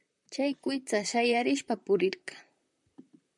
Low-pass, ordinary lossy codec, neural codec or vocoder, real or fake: 10.8 kHz; MP3, 96 kbps; vocoder, 44.1 kHz, 128 mel bands, Pupu-Vocoder; fake